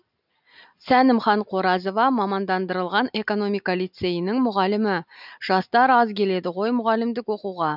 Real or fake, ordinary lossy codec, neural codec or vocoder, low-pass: real; none; none; 5.4 kHz